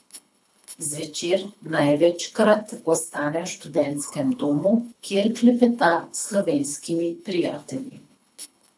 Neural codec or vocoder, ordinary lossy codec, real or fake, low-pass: codec, 24 kHz, 3 kbps, HILCodec; none; fake; none